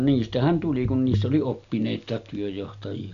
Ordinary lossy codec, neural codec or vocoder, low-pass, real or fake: none; none; 7.2 kHz; real